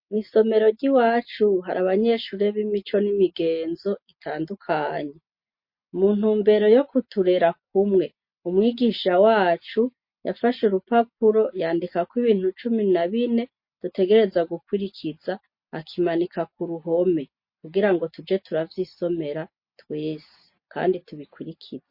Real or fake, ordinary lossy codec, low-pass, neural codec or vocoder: real; MP3, 32 kbps; 5.4 kHz; none